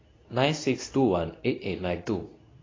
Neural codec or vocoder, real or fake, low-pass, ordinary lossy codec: codec, 24 kHz, 0.9 kbps, WavTokenizer, medium speech release version 2; fake; 7.2 kHz; AAC, 32 kbps